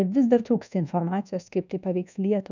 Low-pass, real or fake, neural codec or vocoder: 7.2 kHz; fake; codec, 24 kHz, 1.2 kbps, DualCodec